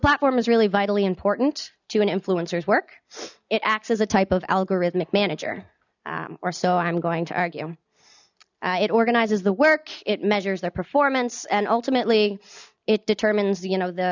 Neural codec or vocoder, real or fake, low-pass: none; real; 7.2 kHz